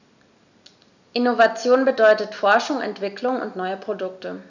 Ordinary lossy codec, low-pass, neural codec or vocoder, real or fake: none; 7.2 kHz; none; real